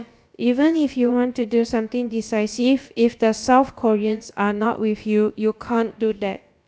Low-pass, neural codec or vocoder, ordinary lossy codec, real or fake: none; codec, 16 kHz, about 1 kbps, DyCAST, with the encoder's durations; none; fake